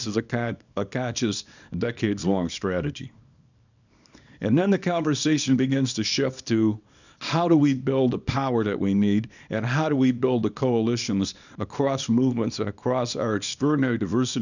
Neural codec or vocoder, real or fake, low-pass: codec, 24 kHz, 0.9 kbps, WavTokenizer, small release; fake; 7.2 kHz